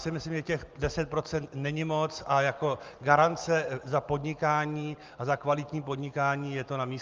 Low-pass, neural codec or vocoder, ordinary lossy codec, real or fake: 7.2 kHz; none; Opus, 32 kbps; real